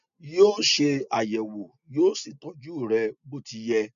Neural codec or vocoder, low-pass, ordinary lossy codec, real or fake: none; 7.2 kHz; none; real